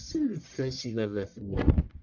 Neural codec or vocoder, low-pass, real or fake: codec, 44.1 kHz, 1.7 kbps, Pupu-Codec; 7.2 kHz; fake